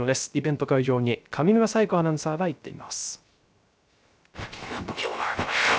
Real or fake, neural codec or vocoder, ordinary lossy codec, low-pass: fake; codec, 16 kHz, 0.3 kbps, FocalCodec; none; none